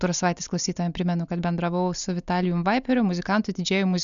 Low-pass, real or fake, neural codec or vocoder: 7.2 kHz; real; none